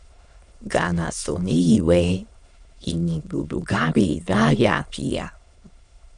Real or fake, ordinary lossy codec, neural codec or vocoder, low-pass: fake; MP3, 96 kbps; autoencoder, 22.05 kHz, a latent of 192 numbers a frame, VITS, trained on many speakers; 9.9 kHz